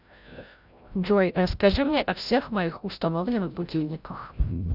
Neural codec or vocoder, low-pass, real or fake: codec, 16 kHz, 0.5 kbps, FreqCodec, larger model; 5.4 kHz; fake